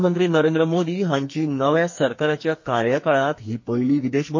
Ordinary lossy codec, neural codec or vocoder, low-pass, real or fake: MP3, 32 kbps; codec, 44.1 kHz, 2.6 kbps, SNAC; 7.2 kHz; fake